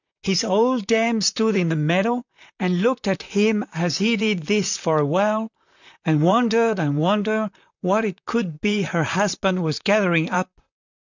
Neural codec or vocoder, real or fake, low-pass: vocoder, 44.1 kHz, 128 mel bands, Pupu-Vocoder; fake; 7.2 kHz